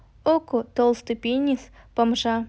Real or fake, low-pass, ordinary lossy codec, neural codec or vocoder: real; none; none; none